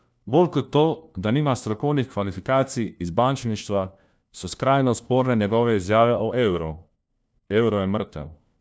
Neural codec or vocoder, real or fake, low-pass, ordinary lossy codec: codec, 16 kHz, 1 kbps, FunCodec, trained on LibriTTS, 50 frames a second; fake; none; none